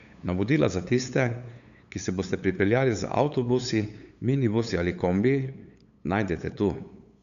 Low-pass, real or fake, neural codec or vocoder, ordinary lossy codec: 7.2 kHz; fake; codec, 16 kHz, 8 kbps, FunCodec, trained on LibriTTS, 25 frames a second; none